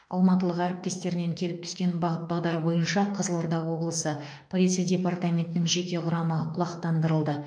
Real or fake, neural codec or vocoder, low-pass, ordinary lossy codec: fake; autoencoder, 48 kHz, 32 numbers a frame, DAC-VAE, trained on Japanese speech; 9.9 kHz; none